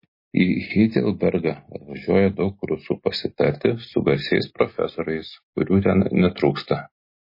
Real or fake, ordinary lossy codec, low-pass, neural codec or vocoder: real; MP3, 24 kbps; 5.4 kHz; none